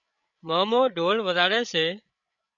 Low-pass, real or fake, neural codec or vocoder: 7.2 kHz; fake; codec, 16 kHz, 8 kbps, FreqCodec, larger model